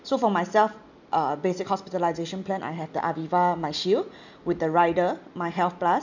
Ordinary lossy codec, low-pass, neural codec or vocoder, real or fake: none; 7.2 kHz; none; real